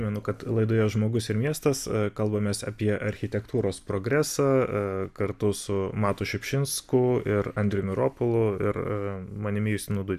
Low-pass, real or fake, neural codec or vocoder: 14.4 kHz; real; none